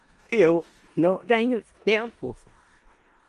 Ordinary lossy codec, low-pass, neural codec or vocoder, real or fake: Opus, 32 kbps; 10.8 kHz; codec, 16 kHz in and 24 kHz out, 0.4 kbps, LongCat-Audio-Codec, four codebook decoder; fake